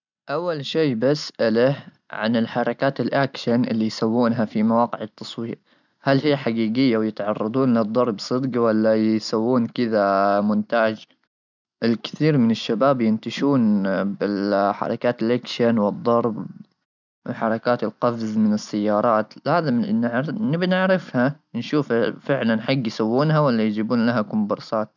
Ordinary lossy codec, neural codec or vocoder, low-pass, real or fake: none; none; 7.2 kHz; real